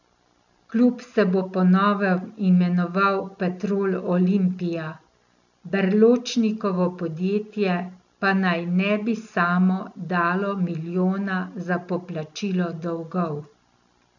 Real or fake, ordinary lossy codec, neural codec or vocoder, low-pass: real; none; none; 7.2 kHz